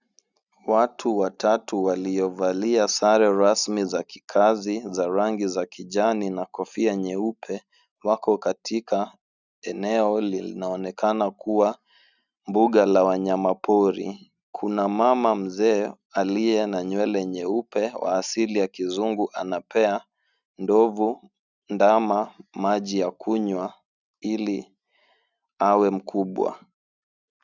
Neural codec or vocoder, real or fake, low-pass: none; real; 7.2 kHz